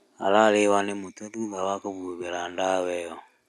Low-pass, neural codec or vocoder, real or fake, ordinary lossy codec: none; none; real; none